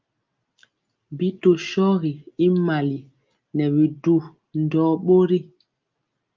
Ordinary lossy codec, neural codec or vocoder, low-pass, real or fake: Opus, 24 kbps; none; 7.2 kHz; real